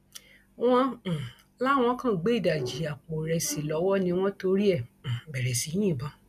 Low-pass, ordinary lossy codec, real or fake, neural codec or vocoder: 14.4 kHz; none; real; none